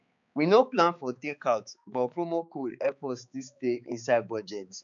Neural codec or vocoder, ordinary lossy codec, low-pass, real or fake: codec, 16 kHz, 4 kbps, X-Codec, HuBERT features, trained on balanced general audio; none; 7.2 kHz; fake